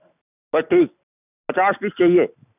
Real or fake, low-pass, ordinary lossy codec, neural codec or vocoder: real; 3.6 kHz; none; none